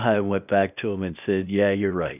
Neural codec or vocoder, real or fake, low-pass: codec, 16 kHz, 0.8 kbps, ZipCodec; fake; 3.6 kHz